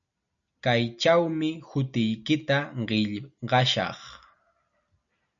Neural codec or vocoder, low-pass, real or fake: none; 7.2 kHz; real